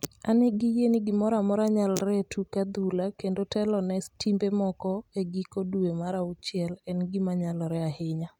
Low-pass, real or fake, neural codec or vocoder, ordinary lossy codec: 19.8 kHz; real; none; none